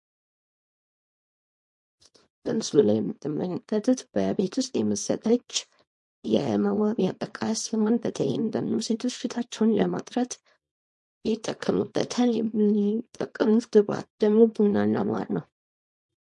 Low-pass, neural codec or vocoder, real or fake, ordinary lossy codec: 10.8 kHz; codec, 24 kHz, 0.9 kbps, WavTokenizer, small release; fake; MP3, 48 kbps